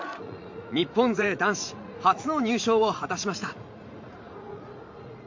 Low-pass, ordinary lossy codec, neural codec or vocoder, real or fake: 7.2 kHz; MP3, 48 kbps; vocoder, 44.1 kHz, 80 mel bands, Vocos; fake